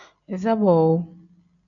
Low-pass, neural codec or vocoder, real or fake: 7.2 kHz; none; real